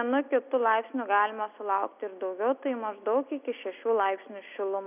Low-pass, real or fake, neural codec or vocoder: 3.6 kHz; real; none